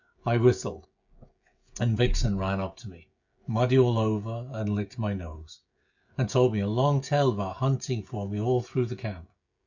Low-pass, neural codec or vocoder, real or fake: 7.2 kHz; codec, 16 kHz, 16 kbps, FreqCodec, smaller model; fake